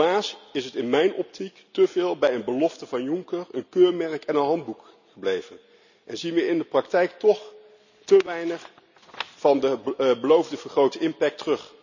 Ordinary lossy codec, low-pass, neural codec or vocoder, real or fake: none; 7.2 kHz; none; real